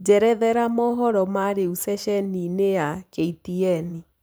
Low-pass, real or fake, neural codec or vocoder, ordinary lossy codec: none; fake; vocoder, 44.1 kHz, 128 mel bands every 512 samples, BigVGAN v2; none